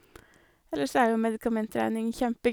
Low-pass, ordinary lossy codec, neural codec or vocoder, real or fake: none; none; none; real